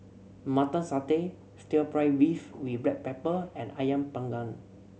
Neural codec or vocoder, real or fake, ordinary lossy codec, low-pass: none; real; none; none